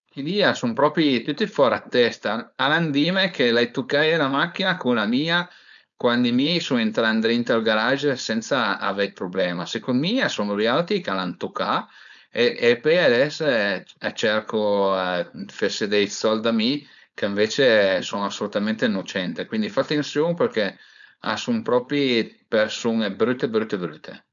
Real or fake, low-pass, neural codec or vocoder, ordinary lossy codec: fake; 7.2 kHz; codec, 16 kHz, 4.8 kbps, FACodec; none